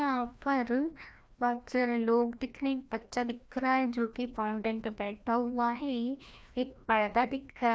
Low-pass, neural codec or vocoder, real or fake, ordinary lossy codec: none; codec, 16 kHz, 1 kbps, FreqCodec, larger model; fake; none